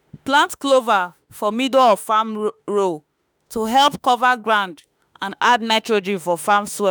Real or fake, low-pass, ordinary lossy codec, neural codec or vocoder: fake; none; none; autoencoder, 48 kHz, 32 numbers a frame, DAC-VAE, trained on Japanese speech